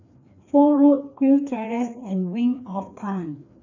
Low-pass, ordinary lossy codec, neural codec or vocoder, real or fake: 7.2 kHz; none; codec, 16 kHz, 2 kbps, FreqCodec, larger model; fake